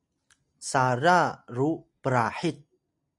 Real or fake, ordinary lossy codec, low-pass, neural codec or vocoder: real; MP3, 96 kbps; 10.8 kHz; none